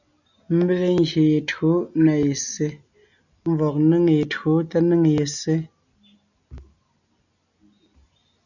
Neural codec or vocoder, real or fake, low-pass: none; real; 7.2 kHz